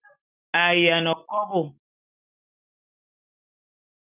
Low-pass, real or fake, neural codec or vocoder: 3.6 kHz; real; none